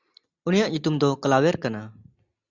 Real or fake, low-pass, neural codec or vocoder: real; 7.2 kHz; none